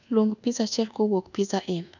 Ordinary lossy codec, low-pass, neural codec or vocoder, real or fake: none; 7.2 kHz; codec, 24 kHz, 1.2 kbps, DualCodec; fake